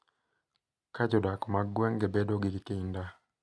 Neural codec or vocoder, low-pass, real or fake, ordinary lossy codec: none; none; real; none